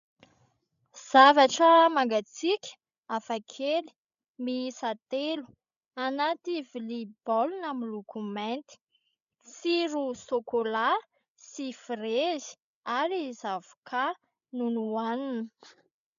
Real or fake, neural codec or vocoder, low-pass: fake; codec, 16 kHz, 16 kbps, FreqCodec, larger model; 7.2 kHz